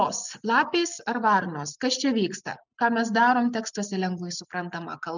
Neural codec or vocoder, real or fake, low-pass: none; real; 7.2 kHz